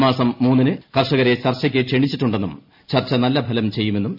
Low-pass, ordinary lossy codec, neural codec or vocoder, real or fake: 5.4 kHz; MP3, 48 kbps; none; real